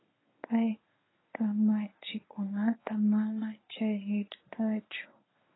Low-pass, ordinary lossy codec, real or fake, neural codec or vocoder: 7.2 kHz; AAC, 16 kbps; fake; codec, 24 kHz, 0.9 kbps, WavTokenizer, medium speech release version 1